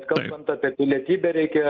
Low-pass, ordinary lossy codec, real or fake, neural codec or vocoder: 7.2 kHz; Opus, 24 kbps; real; none